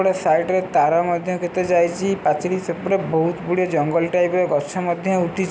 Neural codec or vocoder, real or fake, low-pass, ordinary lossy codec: none; real; none; none